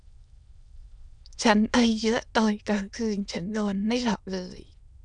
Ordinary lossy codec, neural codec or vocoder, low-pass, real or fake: none; autoencoder, 22.05 kHz, a latent of 192 numbers a frame, VITS, trained on many speakers; 9.9 kHz; fake